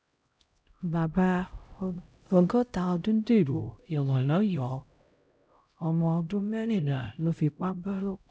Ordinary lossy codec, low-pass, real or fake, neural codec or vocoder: none; none; fake; codec, 16 kHz, 0.5 kbps, X-Codec, HuBERT features, trained on LibriSpeech